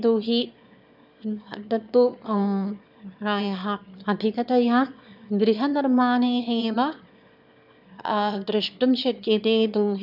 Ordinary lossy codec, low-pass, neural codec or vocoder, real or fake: none; 5.4 kHz; autoencoder, 22.05 kHz, a latent of 192 numbers a frame, VITS, trained on one speaker; fake